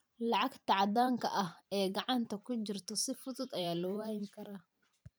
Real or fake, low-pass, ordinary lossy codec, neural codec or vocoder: fake; none; none; vocoder, 44.1 kHz, 128 mel bands every 512 samples, BigVGAN v2